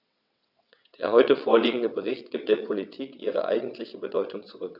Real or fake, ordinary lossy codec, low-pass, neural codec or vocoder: fake; none; 5.4 kHz; vocoder, 22.05 kHz, 80 mel bands, WaveNeXt